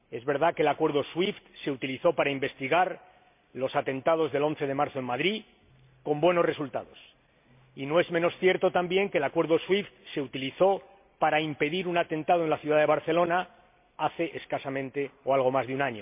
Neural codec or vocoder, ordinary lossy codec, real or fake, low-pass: none; MP3, 32 kbps; real; 3.6 kHz